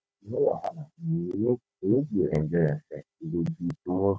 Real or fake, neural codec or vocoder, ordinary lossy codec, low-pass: fake; codec, 16 kHz, 16 kbps, FunCodec, trained on Chinese and English, 50 frames a second; none; none